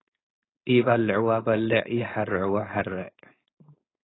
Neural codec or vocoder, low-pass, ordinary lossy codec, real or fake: codec, 16 kHz, 4.8 kbps, FACodec; 7.2 kHz; AAC, 16 kbps; fake